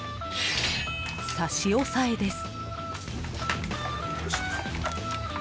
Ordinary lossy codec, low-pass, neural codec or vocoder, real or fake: none; none; none; real